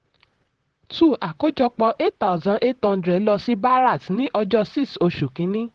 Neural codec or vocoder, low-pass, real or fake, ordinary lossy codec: vocoder, 44.1 kHz, 128 mel bands, Pupu-Vocoder; 10.8 kHz; fake; Opus, 16 kbps